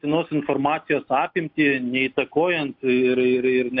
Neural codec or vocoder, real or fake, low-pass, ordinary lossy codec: none; real; 5.4 kHz; AAC, 48 kbps